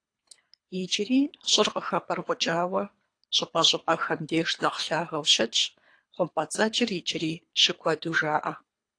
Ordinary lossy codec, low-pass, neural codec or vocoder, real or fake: AAC, 48 kbps; 9.9 kHz; codec, 24 kHz, 3 kbps, HILCodec; fake